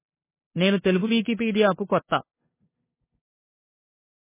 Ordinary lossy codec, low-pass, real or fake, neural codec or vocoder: MP3, 16 kbps; 3.6 kHz; fake; codec, 16 kHz, 2 kbps, FunCodec, trained on LibriTTS, 25 frames a second